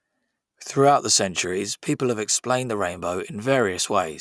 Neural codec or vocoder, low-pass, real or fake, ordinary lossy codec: none; none; real; none